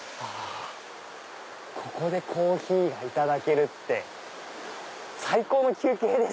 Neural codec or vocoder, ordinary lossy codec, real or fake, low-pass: none; none; real; none